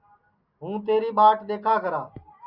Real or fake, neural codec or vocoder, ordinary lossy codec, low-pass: real; none; Opus, 64 kbps; 5.4 kHz